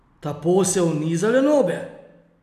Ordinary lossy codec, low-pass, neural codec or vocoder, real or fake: none; 14.4 kHz; none; real